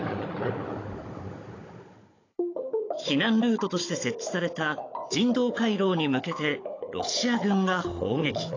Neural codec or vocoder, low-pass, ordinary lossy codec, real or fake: codec, 16 kHz, 16 kbps, FunCodec, trained on Chinese and English, 50 frames a second; 7.2 kHz; AAC, 32 kbps; fake